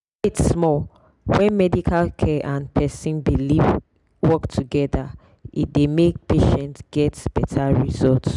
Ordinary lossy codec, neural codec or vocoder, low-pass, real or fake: none; none; 10.8 kHz; real